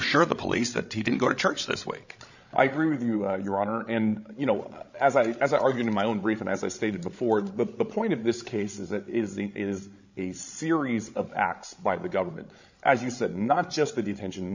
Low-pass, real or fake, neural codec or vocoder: 7.2 kHz; fake; codec, 16 kHz, 16 kbps, FreqCodec, larger model